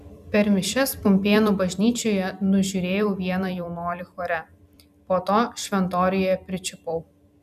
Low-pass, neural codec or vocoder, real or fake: 14.4 kHz; vocoder, 44.1 kHz, 128 mel bands every 256 samples, BigVGAN v2; fake